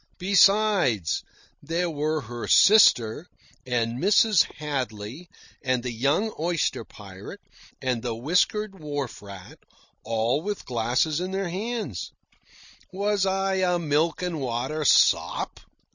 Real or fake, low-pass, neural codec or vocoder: real; 7.2 kHz; none